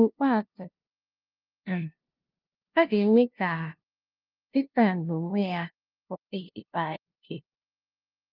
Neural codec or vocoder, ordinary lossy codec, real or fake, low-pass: codec, 16 kHz, 0.5 kbps, FunCodec, trained on LibriTTS, 25 frames a second; Opus, 24 kbps; fake; 5.4 kHz